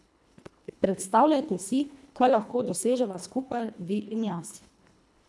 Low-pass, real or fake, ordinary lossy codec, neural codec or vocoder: none; fake; none; codec, 24 kHz, 1.5 kbps, HILCodec